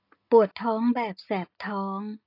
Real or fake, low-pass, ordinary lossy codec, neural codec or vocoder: real; 5.4 kHz; AAC, 32 kbps; none